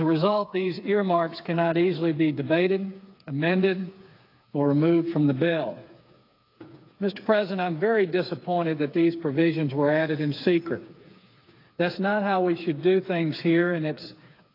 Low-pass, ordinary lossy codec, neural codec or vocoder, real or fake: 5.4 kHz; AAC, 32 kbps; codec, 16 kHz, 4 kbps, FreqCodec, smaller model; fake